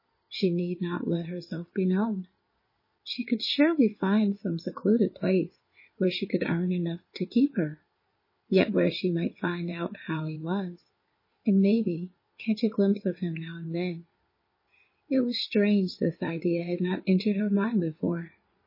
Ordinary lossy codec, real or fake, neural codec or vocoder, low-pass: MP3, 24 kbps; fake; codec, 16 kHz in and 24 kHz out, 2.2 kbps, FireRedTTS-2 codec; 5.4 kHz